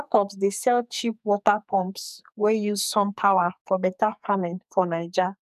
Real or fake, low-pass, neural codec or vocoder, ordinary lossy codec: fake; 14.4 kHz; codec, 44.1 kHz, 2.6 kbps, SNAC; none